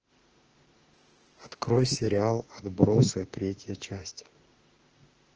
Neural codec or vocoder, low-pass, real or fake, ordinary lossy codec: autoencoder, 48 kHz, 32 numbers a frame, DAC-VAE, trained on Japanese speech; 7.2 kHz; fake; Opus, 16 kbps